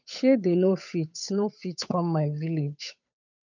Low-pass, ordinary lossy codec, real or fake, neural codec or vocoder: 7.2 kHz; none; fake; codec, 16 kHz, 8 kbps, FunCodec, trained on Chinese and English, 25 frames a second